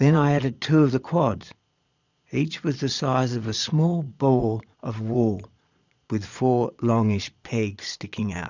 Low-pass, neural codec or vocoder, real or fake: 7.2 kHz; vocoder, 22.05 kHz, 80 mel bands, Vocos; fake